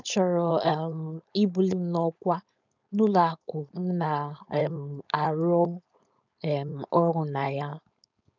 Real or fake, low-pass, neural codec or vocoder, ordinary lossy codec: fake; 7.2 kHz; codec, 16 kHz, 4.8 kbps, FACodec; none